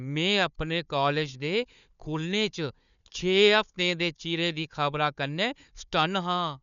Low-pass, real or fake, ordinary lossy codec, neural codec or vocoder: 7.2 kHz; fake; none; codec, 16 kHz, 4 kbps, FunCodec, trained on Chinese and English, 50 frames a second